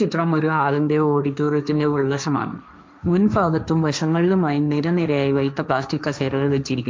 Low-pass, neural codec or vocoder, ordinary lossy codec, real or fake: none; codec, 16 kHz, 1.1 kbps, Voila-Tokenizer; none; fake